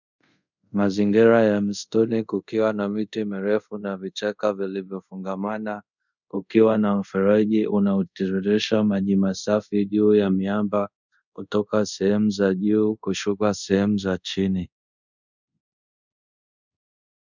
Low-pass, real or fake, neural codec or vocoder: 7.2 kHz; fake; codec, 24 kHz, 0.5 kbps, DualCodec